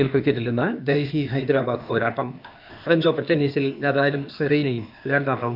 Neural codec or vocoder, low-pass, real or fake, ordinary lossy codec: codec, 16 kHz, 0.8 kbps, ZipCodec; 5.4 kHz; fake; none